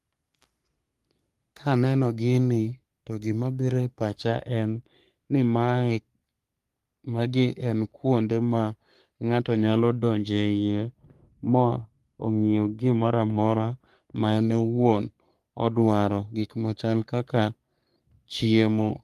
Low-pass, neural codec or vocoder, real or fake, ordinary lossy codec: 14.4 kHz; codec, 44.1 kHz, 3.4 kbps, Pupu-Codec; fake; Opus, 32 kbps